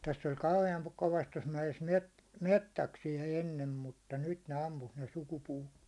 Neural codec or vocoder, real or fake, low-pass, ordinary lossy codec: none; real; none; none